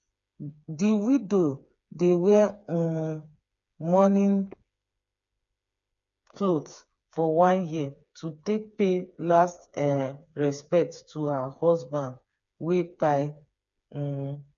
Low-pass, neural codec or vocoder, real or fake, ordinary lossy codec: 7.2 kHz; codec, 16 kHz, 4 kbps, FreqCodec, smaller model; fake; none